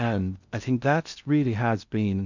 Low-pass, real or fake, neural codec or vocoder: 7.2 kHz; fake; codec, 16 kHz in and 24 kHz out, 0.6 kbps, FocalCodec, streaming, 2048 codes